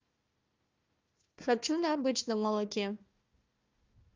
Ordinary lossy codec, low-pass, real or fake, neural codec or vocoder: Opus, 24 kbps; 7.2 kHz; fake; codec, 16 kHz, 1 kbps, FunCodec, trained on Chinese and English, 50 frames a second